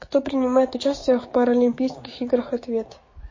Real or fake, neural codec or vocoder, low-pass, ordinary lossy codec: fake; codec, 16 kHz, 6 kbps, DAC; 7.2 kHz; MP3, 32 kbps